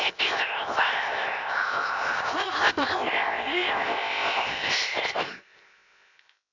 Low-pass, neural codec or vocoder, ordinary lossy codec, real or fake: 7.2 kHz; codec, 16 kHz, 0.7 kbps, FocalCodec; none; fake